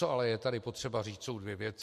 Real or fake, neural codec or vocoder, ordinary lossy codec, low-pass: real; none; MP3, 64 kbps; 14.4 kHz